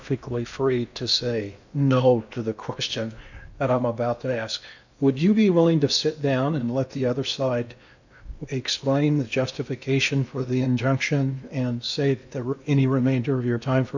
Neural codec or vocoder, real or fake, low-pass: codec, 16 kHz in and 24 kHz out, 0.8 kbps, FocalCodec, streaming, 65536 codes; fake; 7.2 kHz